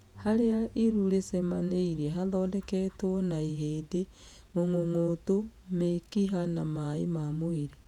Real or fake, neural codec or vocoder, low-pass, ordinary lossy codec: fake; vocoder, 48 kHz, 128 mel bands, Vocos; 19.8 kHz; none